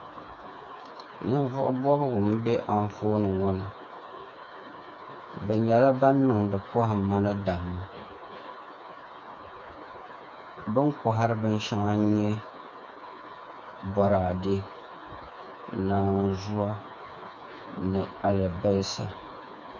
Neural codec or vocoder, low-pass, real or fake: codec, 16 kHz, 4 kbps, FreqCodec, smaller model; 7.2 kHz; fake